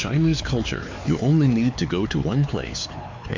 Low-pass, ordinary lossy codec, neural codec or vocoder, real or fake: 7.2 kHz; MP3, 64 kbps; codec, 16 kHz, 4 kbps, X-Codec, HuBERT features, trained on LibriSpeech; fake